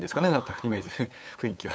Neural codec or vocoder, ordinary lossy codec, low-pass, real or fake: codec, 16 kHz, 8 kbps, FunCodec, trained on LibriTTS, 25 frames a second; none; none; fake